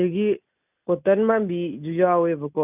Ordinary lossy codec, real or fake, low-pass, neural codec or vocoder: none; real; 3.6 kHz; none